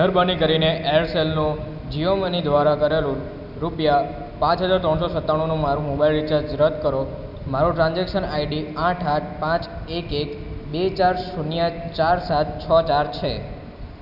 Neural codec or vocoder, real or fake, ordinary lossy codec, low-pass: none; real; none; 5.4 kHz